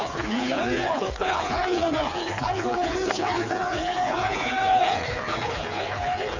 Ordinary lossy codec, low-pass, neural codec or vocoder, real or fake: none; 7.2 kHz; codec, 16 kHz, 4 kbps, FreqCodec, smaller model; fake